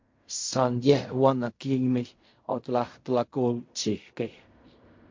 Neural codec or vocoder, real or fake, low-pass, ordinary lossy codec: codec, 16 kHz in and 24 kHz out, 0.4 kbps, LongCat-Audio-Codec, fine tuned four codebook decoder; fake; 7.2 kHz; MP3, 48 kbps